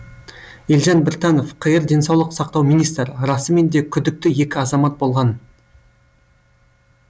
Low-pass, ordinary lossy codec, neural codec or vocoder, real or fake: none; none; none; real